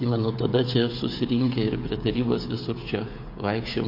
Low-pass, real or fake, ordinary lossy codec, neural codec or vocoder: 5.4 kHz; fake; MP3, 32 kbps; codec, 16 kHz, 16 kbps, FreqCodec, smaller model